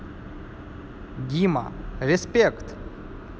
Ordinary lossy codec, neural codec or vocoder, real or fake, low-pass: none; none; real; none